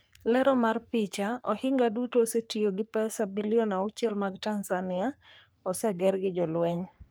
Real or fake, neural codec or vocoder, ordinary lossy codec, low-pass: fake; codec, 44.1 kHz, 3.4 kbps, Pupu-Codec; none; none